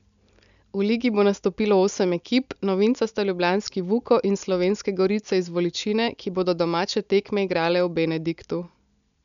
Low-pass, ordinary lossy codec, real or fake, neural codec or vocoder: 7.2 kHz; none; real; none